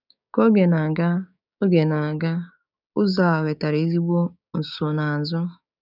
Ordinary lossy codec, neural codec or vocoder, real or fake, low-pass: none; codec, 16 kHz, 6 kbps, DAC; fake; 5.4 kHz